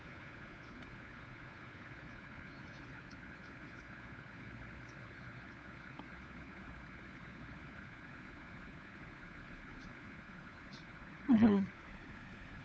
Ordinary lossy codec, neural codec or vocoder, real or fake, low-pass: none; codec, 16 kHz, 4 kbps, FunCodec, trained on LibriTTS, 50 frames a second; fake; none